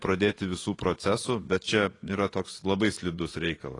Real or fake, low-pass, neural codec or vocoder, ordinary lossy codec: fake; 10.8 kHz; vocoder, 24 kHz, 100 mel bands, Vocos; AAC, 32 kbps